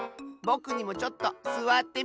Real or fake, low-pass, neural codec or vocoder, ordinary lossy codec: real; none; none; none